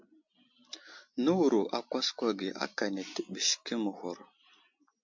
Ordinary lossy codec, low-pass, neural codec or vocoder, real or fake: MP3, 48 kbps; 7.2 kHz; none; real